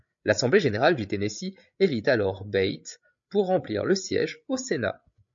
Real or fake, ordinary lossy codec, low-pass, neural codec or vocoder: fake; MP3, 48 kbps; 7.2 kHz; codec, 16 kHz, 8 kbps, FreqCodec, larger model